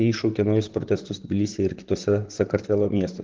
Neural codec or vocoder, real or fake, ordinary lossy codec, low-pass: vocoder, 44.1 kHz, 128 mel bands, Pupu-Vocoder; fake; Opus, 16 kbps; 7.2 kHz